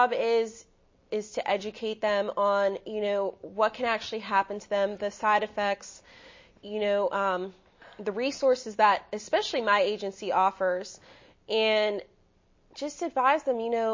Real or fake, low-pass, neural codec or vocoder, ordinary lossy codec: real; 7.2 kHz; none; MP3, 32 kbps